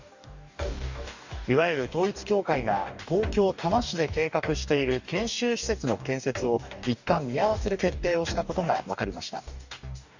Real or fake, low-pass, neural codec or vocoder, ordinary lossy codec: fake; 7.2 kHz; codec, 44.1 kHz, 2.6 kbps, DAC; none